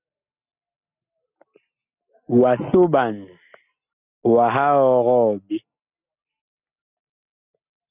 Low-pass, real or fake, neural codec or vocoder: 3.6 kHz; real; none